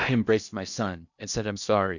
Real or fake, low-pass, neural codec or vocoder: fake; 7.2 kHz; codec, 16 kHz in and 24 kHz out, 0.8 kbps, FocalCodec, streaming, 65536 codes